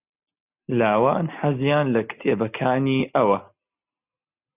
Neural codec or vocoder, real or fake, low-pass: none; real; 3.6 kHz